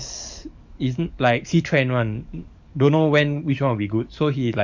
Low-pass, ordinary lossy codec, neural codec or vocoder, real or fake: 7.2 kHz; none; codec, 44.1 kHz, 7.8 kbps, DAC; fake